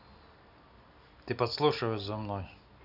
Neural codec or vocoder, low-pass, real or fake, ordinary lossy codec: none; 5.4 kHz; real; none